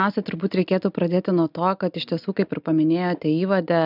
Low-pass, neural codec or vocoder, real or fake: 5.4 kHz; none; real